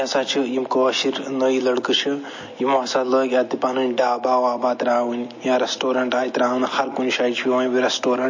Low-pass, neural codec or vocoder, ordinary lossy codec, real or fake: 7.2 kHz; none; MP3, 32 kbps; real